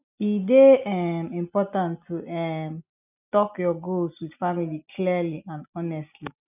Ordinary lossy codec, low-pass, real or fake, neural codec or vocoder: none; 3.6 kHz; real; none